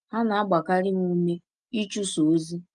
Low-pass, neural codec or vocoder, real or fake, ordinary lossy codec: 10.8 kHz; none; real; Opus, 32 kbps